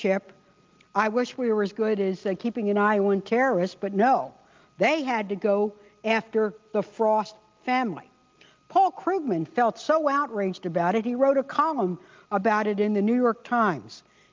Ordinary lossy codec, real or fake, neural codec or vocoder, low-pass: Opus, 32 kbps; real; none; 7.2 kHz